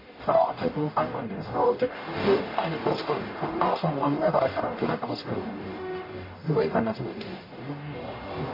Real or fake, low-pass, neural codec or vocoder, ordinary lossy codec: fake; 5.4 kHz; codec, 44.1 kHz, 0.9 kbps, DAC; MP3, 24 kbps